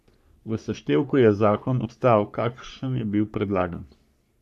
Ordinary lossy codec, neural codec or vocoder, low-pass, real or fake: none; codec, 44.1 kHz, 3.4 kbps, Pupu-Codec; 14.4 kHz; fake